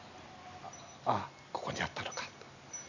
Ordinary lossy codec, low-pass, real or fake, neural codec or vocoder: none; 7.2 kHz; real; none